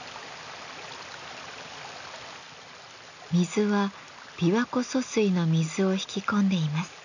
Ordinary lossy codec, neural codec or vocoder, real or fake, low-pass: none; none; real; 7.2 kHz